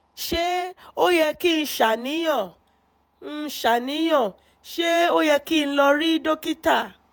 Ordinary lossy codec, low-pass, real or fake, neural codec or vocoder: none; none; fake; vocoder, 48 kHz, 128 mel bands, Vocos